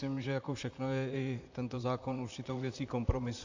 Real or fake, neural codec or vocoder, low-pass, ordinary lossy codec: fake; vocoder, 44.1 kHz, 128 mel bands, Pupu-Vocoder; 7.2 kHz; AAC, 48 kbps